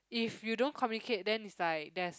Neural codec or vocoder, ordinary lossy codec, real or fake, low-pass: none; none; real; none